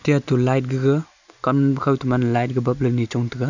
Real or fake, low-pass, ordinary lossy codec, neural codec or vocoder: real; 7.2 kHz; none; none